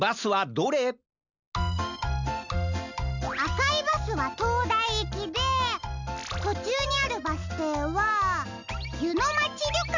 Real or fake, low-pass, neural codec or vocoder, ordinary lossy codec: real; 7.2 kHz; none; none